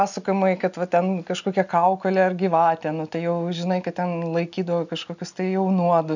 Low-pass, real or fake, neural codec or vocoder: 7.2 kHz; real; none